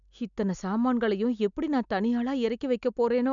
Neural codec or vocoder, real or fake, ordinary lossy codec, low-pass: none; real; none; 7.2 kHz